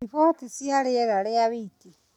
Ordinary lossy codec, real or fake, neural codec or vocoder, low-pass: none; real; none; 19.8 kHz